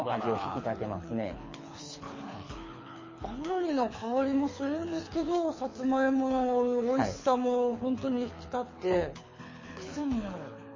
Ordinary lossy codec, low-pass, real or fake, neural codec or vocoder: MP3, 32 kbps; 7.2 kHz; fake; codec, 24 kHz, 6 kbps, HILCodec